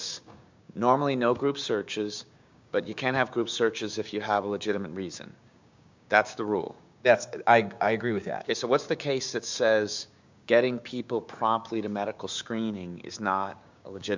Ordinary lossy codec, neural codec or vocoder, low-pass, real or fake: MP3, 64 kbps; codec, 16 kHz, 6 kbps, DAC; 7.2 kHz; fake